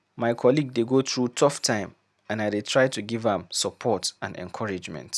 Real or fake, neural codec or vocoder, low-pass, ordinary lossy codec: real; none; none; none